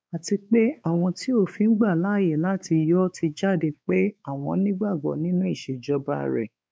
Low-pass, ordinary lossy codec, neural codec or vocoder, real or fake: none; none; codec, 16 kHz, 4 kbps, X-Codec, WavLM features, trained on Multilingual LibriSpeech; fake